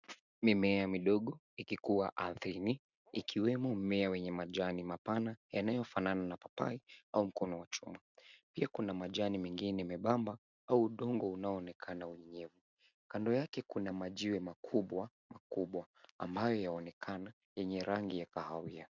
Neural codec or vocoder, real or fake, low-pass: none; real; 7.2 kHz